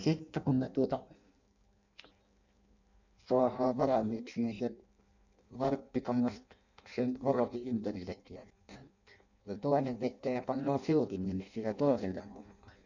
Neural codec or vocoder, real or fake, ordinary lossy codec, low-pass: codec, 16 kHz in and 24 kHz out, 0.6 kbps, FireRedTTS-2 codec; fake; none; 7.2 kHz